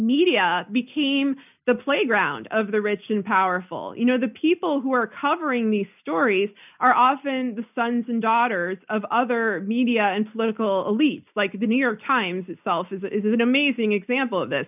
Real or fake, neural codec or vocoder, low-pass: real; none; 3.6 kHz